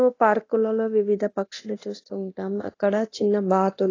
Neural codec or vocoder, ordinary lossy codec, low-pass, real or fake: codec, 24 kHz, 0.9 kbps, DualCodec; AAC, 32 kbps; 7.2 kHz; fake